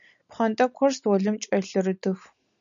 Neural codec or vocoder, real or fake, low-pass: none; real; 7.2 kHz